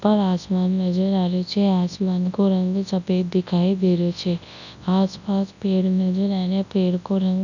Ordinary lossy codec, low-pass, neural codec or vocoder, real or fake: none; 7.2 kHz; codec, 24 kHz, 0.9 kbps, WavTokenizer, large speech release; fake